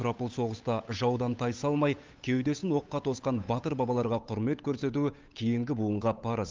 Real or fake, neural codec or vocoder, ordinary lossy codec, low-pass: fake; codec, 16 kHz, 8 kbps, FunCodec, trained on Chinese and English, 25 frames a second; Opus, 24 kbps; 7.2 kHz